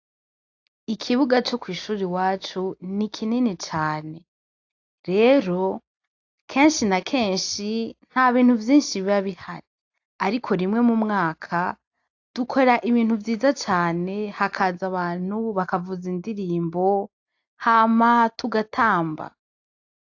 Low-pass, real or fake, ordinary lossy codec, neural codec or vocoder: 7.2 kHz; real; AAC, 48 kbps; none